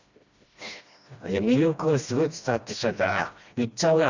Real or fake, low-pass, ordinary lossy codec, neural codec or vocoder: fake; 7.2 kHz; Opus, 64 kbps; codec, 16 kHz, 1 kbps, FreqCodec, smaller model